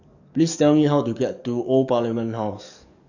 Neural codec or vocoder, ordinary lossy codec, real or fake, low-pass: codec, 44.1 kHz, 7.8 kbps, DAC; none; fake; 7.2 kHz